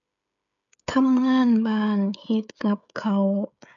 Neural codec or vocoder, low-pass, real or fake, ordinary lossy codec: codec, 16 kHz, 16 kbps, FreqCodec, smaller model; 7.2 kHz; fake; none